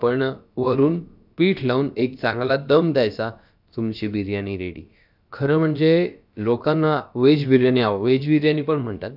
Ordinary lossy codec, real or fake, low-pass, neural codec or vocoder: none; fake; 5.4 kHz; codec, 16 kHz, about 1 kbps, DyCAST, with the encoder's durations